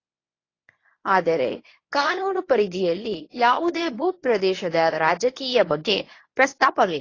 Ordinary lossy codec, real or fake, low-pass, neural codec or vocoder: AAC, 32 kbps; fake; 7.2 kHz; codec, 24 kHz, 0.9 kbps, WavTokenizer, medium speech release version 1